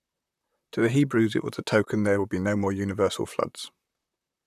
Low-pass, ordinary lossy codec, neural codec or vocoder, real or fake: 14.4 kHz; none; vocoder, 44.1 kHz, 128 mel bands, Pupu-Vocoder; fake